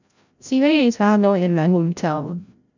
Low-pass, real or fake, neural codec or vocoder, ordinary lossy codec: 7.2 kHz; fake; codec, 16 kHz, 0.5 kbps, FreqCodec, larger model; none